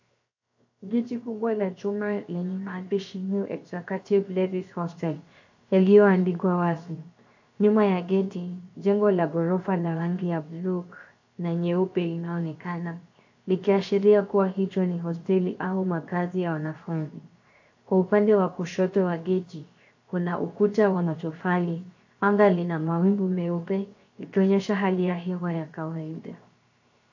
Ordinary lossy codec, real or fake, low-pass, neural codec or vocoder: AAC, 48 kbps; fake; 7.2 kHz; codec, 16 kHz, 0.7 kbps, FocalCodec